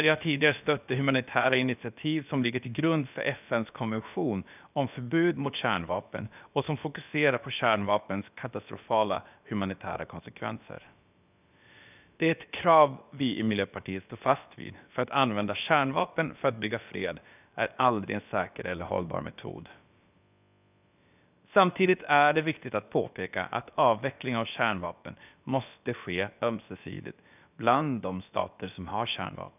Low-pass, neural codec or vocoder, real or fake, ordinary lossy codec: 3.6 kHz; codec, 16 kHz, about 1 kbps, DyCAST, with the encoder's durations; fake; none